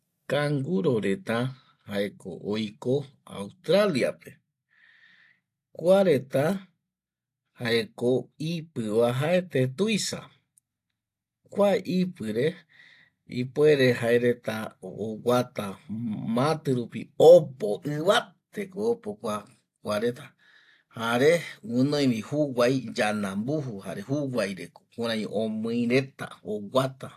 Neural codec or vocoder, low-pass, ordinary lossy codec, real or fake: none; 14.4 kHz; AAC, 64 kbps; real